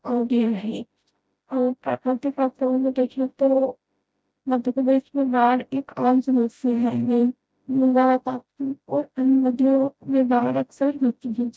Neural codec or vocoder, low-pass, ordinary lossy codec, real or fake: codec, 16 kHz, 0.5 kbps, FreqCodec, smaller model; none; none; fake